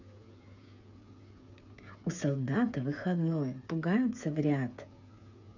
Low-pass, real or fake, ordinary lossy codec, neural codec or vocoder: 7.2 kHz; fake; none; codec, 16 kHz, 8 kbps, FreqCodec, smaller model